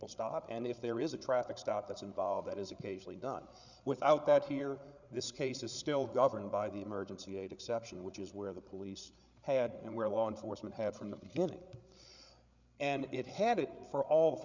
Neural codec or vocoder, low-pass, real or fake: codec, 16 kHz, 8 kbps, FreqCodec, larger model; 7.2 kHz; fake